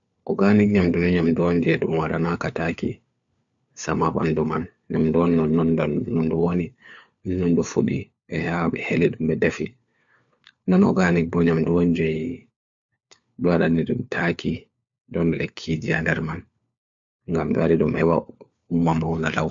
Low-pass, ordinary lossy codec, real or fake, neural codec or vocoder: 7.2 kHz; MP3, 64 kbps; fake; codec, 16 kHz, 4 kbps, FunCodec, trained on LibriTTS, 50 frames a second